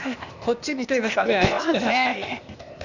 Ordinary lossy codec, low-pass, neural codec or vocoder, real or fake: none; 7.2 kHz; codec, 16 kHz, 0.8 kbps, ZipCodec; fake